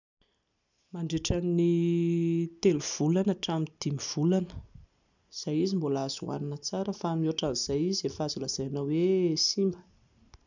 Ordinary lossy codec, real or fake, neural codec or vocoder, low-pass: none; real; none; 7.2 kHz